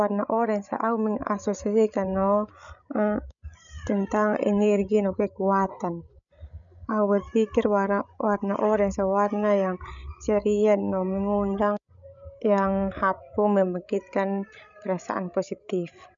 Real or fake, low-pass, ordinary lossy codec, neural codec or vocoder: fake; 7.2 kHz; none; codec, 16 kHz, 16 kbps, FreqCodec, larger model